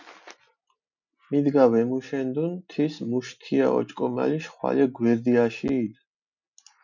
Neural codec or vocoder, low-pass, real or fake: none; 7.2 kHz; real